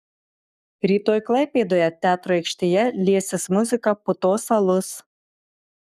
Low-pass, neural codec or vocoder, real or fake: 14.4 kHz; codec, 44.1 kHz, 7.8 kbps, Pupu-Codec; fake